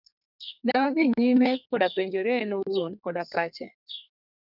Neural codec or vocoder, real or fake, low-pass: codec, 32 kHz, 1.9 kbps, SNAC; fake; 5.4 kHz